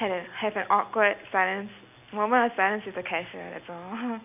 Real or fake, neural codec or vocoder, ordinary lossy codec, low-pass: real; none; none; 3.6 kHz